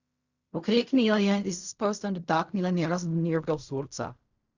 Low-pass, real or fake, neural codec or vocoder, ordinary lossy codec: 7.2 kHz; fake; codec, 16 kHz in and 24 kHz out, 0.4 kbps, LongCat-Audio-Codec, fine tuned four codebook decoder; Opus, 64 kbps